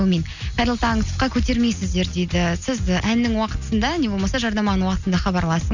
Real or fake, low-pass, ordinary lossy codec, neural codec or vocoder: real; 7.2 kHz; none; none